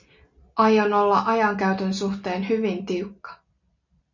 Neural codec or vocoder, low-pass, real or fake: none; 7.2 kHz; real